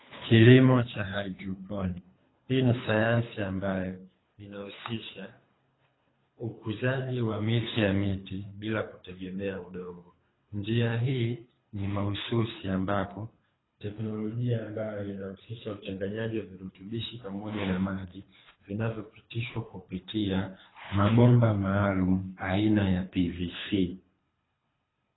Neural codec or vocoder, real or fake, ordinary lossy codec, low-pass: codec, 24 kHz, 3 kbps, HILCodec; fake; AAC, 16 kbps; 7.2 kHz